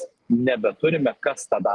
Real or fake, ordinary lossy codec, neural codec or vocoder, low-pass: real; Opus, 24 kbps; none; 10.8 kHz